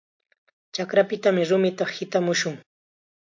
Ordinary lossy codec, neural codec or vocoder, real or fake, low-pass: MP3, 48 kbps; none; real; 7.2 kHz